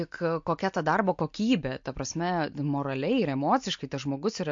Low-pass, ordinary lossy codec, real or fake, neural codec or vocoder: 7.2 kHz; MP3, 48 kbps; real; none